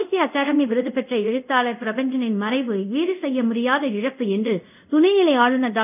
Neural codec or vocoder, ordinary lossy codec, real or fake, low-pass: codec, 24 kHz, 0.5 kbps, DualCodec; none; fake; 3.6 kHz